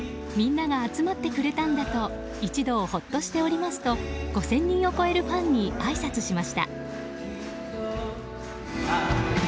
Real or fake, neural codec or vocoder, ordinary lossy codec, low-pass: real; none; none; none